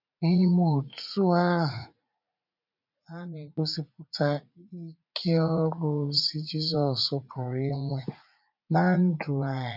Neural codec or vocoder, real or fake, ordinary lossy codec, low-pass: vocoder, 44.1 kHz, 80 mel bands, Vocos; fake; none; 5.4 kHz